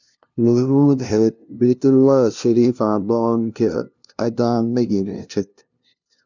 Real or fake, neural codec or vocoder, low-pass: fake; codec, 16 kHz, 0.5 kbps, FunCodec, trained on LibriTTS, 25 frames a second; 7.2 kHz